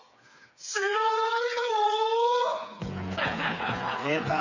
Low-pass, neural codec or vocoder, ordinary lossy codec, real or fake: 7.2 kHz; codec, 16 kHz, 4 kbps, FreqCodec, smaller model; none; fake